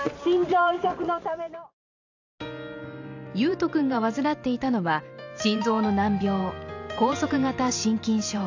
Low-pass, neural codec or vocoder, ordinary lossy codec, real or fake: 7.2 kHz; vocoder, 44.1 kHz, 128 mel bands every 512 samples, BigVGAN v2; AAC, 48 kbps; fake